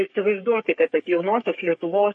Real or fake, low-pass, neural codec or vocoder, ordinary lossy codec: fake; 10.8 kHz; codec, 44.1 kHz, 3.4 kbps, Pupu-Codec; MP3, 48 kbps